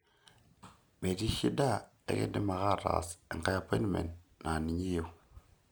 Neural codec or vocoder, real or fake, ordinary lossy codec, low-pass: none; real; none; none